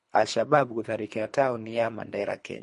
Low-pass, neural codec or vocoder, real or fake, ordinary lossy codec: 10.8 kHz; codec, 24 kHz, 3 kbps, HILCodec; fake; MP3, 48 kbps